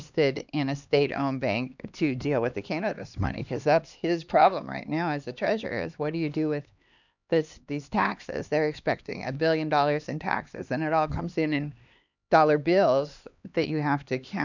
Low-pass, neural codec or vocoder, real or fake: 7.2 kHz; codec, 16 kHz, 2 kbps, X-Codec, HuBERT features, trained on LibriSpeech; fake